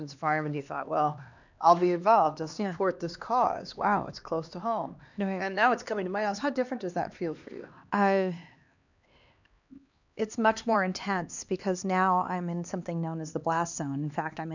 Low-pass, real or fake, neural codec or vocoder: 7.2 kHz; fake; codec, 16 kHz, 2 kbps, X-Codec, HuBERT features, trained on LibriSpeech